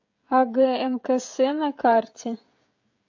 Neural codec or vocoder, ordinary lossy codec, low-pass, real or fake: codec, 16 kHz, 16 kbps, FreqCodec, smaller model; AAC, 48 kbps; 7.2 kHz; fake